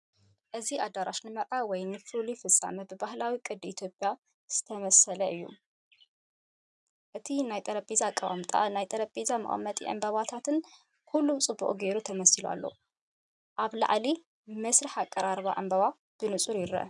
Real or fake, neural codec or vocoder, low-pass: real; none; 10.8 kHz